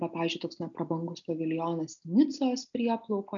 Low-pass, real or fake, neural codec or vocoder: 7.2 kHz; real; none